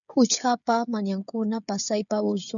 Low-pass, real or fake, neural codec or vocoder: 7.2 kHz; fake; codec, 16 kHz, 16 kbps, FreqCodec, smaller model